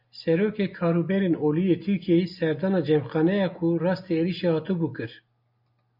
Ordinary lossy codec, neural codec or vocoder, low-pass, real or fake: MP3, 48 kbps; none; 5.4 kHz; real